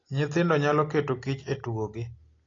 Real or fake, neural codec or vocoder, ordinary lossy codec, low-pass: real; none; AAC, 32 kbps; 7.2 kHz